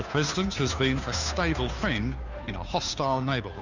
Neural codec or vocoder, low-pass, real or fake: codec, 16 kHz, 2 kbps, FunCodec, trained on Chinese and English, 25 frames a second; 7.2 kHz; fake